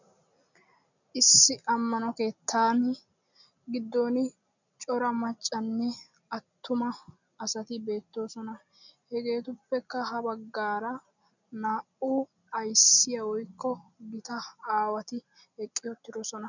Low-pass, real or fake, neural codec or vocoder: 7.2 kHz; real; none